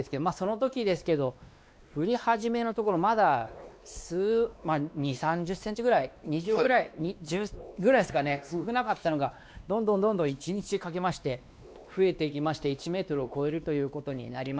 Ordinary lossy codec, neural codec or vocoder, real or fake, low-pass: none; codec, 16 kHz, 2 kbps, X-Codec, WavLM features, trained on Multilingual LibriSpeech; fake; none